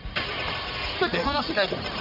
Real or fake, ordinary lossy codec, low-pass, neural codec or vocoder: fake; none; 5.4 kHz; codec, 44.1 kHz, 1.7 kbps, Pupu-Codec